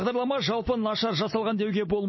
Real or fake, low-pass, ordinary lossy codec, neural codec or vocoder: real; 7.2 kHz; MP3, 24 kbps; none